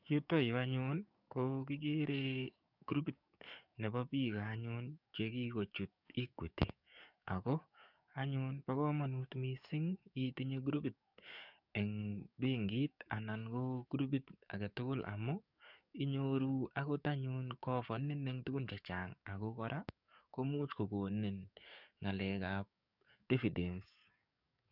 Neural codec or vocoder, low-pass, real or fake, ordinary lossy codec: codec, 44.1 kHz, 7.8 kbps, DAC; 5.4 kHz; fake; none